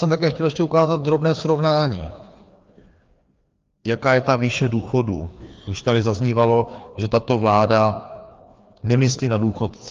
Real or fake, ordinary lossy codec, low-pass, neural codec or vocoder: fake; Opus, 32 kbps; 7.2 kHz; codec, 16 kHz, 2 kbps, FreqCodec, larger model